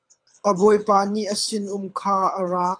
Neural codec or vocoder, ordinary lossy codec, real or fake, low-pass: codec, 24 kHz, 6 kbps, HILCodec; AAC, 64 kbps; fake; 9.9 kHz